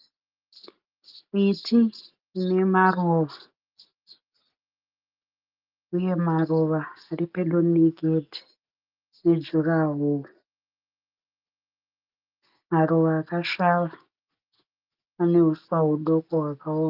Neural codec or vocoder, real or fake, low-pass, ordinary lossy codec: none; real; 5.4 kHz; Opus, 32 kbps